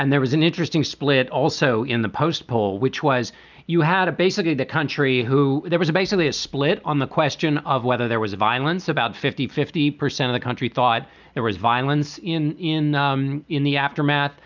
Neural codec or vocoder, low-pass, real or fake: none; 7.2 kHz; real